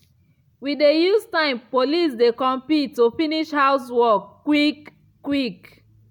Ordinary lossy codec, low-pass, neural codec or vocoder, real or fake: none; 19.8 kHz; none; real